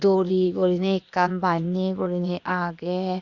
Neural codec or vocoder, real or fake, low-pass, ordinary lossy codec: codec, 16 kHz, 0.8 kbps, ZipCodec; fake; 7.2 kHz; Opus, 64 kbps